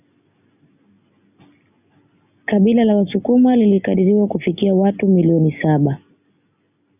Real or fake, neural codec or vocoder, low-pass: real; none; 3.6 kHz